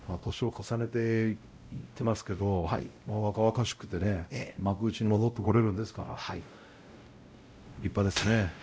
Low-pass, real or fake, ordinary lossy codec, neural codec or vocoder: none; fake; none; codec, 16 kHz, 0.5 kbps, X-Codec, WavLM features, trained on Multilingual LibriSpeech